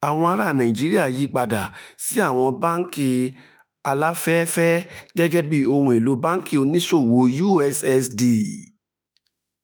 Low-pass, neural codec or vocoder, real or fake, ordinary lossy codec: none; autoencoder, 48 kHz, 32 numbers a frame, DAC-VAE, trained on Japanese speech; fake; none